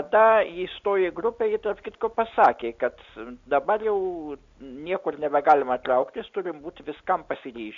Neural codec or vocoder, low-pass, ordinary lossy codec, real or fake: none; 7.2 kHz; MP3, 48 kbps; real